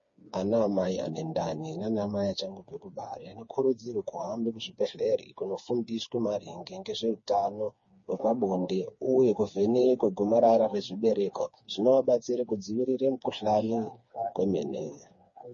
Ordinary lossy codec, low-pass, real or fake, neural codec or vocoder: MP3, 32 kbps; 7.2 kHz; fake; codec, 16 kHz, 4 kbps, FreqCodec, smaller model